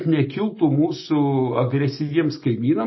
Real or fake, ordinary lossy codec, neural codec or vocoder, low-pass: real; MP3, 24 kbps; none; 7.2 kHz